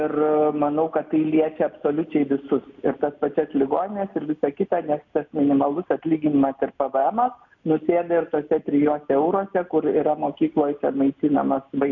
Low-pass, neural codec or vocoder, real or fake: 7.2 kHz; none; real